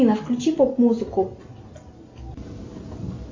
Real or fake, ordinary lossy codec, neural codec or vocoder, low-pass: real; MP3, 48 kbps; none; 7.2 kHz